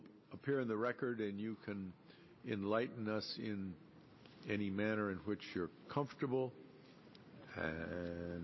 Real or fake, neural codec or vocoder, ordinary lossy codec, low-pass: real; none; MP3, 24 kbps; 7.2 kHz